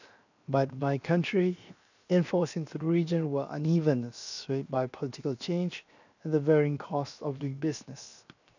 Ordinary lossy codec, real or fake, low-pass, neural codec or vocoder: none; fake; 7.2 kHz; codec, 16 kHz, 0.7 kbps, FocalCodec